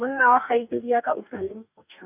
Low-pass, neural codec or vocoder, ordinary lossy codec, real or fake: 3.6 kHz; codec, 44.1 kHz, 2.6 kbps, DAC; none; fake